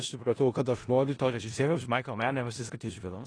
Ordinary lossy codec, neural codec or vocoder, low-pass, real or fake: AAC, 32 kbps; codec, 16 kHz in and 24 kHz out, 0.4 kbps, LongCat-Audio-Codec, four codebook decoder; 9.9 kHz; fake